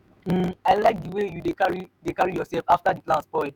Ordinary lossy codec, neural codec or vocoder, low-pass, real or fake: none; autoencoder, 48 kHz, 128 numbers a frame, DAC-VAE, trained on Japanese speech; none; fake